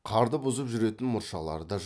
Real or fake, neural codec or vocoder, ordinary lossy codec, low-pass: real; none; none; none